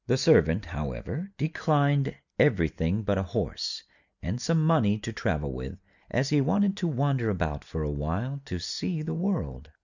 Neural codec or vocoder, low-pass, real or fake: none; 7.2 kHz; real